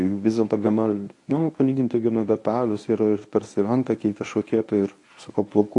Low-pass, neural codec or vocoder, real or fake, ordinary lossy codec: 10.8 kHz; codec, 24 kHz, 0.9 kbps, WavTokenizer, medium speech release version 1; fake; AAC, 48 kbps